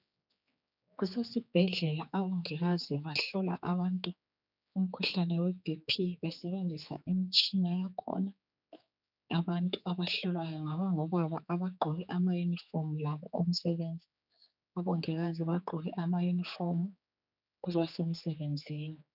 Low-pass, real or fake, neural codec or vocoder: 5.4 kHz; fake; codec, 16 kHz, 4 kbps, X-Codec, HuBERT features, trained on general audio